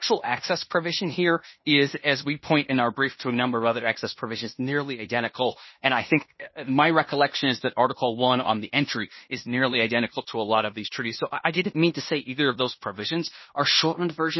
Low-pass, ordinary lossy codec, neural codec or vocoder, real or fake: 7.2 kHz; MP3, 24 kbps; codec, 16 kHz in and 24 kHz out, 0.9 kbps, LongCat-Audio-Codec, fine tuned four codebook decoder; fake